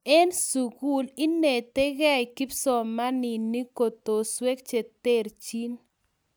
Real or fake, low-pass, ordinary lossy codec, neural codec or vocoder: real; none; none; none